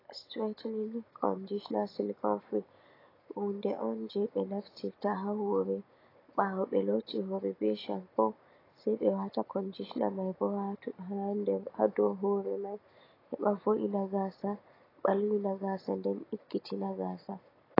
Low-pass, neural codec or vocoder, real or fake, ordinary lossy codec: 5.4 kHz; none; real; AAC, 24 kbps